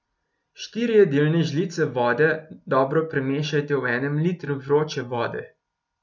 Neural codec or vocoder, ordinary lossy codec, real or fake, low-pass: none; none; real; 7.2 kHz